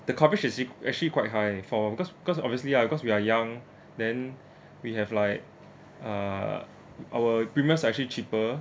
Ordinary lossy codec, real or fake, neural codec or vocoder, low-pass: none; real; none; none